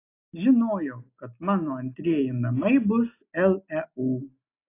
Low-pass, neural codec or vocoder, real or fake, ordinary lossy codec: 3.6 kHz; none; real; AAC, 24 kbps